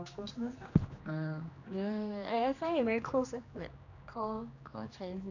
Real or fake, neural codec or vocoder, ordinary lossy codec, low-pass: fake; codec, 16 kHz, 2 kbps, X-Codec, HuBERT features, trained on general audio; none; 7.2 kHz